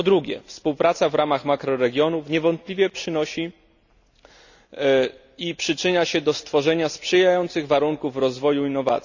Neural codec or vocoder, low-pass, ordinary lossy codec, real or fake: none; 7.2 kHz; none; real